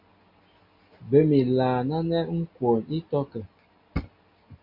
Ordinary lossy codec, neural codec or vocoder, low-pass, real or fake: MP3, 32 kbps; none; 5.4 kHz; real